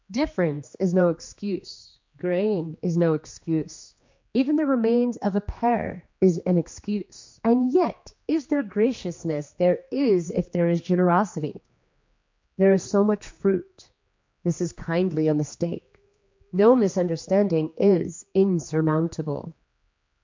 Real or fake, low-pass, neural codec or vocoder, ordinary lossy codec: fake; 7.2 kHz; codec, 16 kHz, 2 kbps, X-Codec, HuBERT features, trained on general audio; MP3, 48 kbps